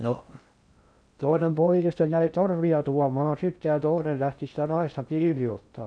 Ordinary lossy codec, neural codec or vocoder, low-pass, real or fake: none; codec, 16 kHz in and 24 kHz out, 0.6 kbps, FocalCodec, streaming, 2048 codes; 9.9 kHz; fake